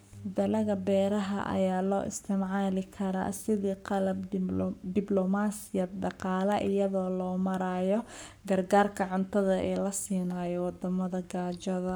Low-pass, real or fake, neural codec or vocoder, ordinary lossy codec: none; fake; codec, 44.1 kHz, 7.8 kbps, Pupu-Codec; none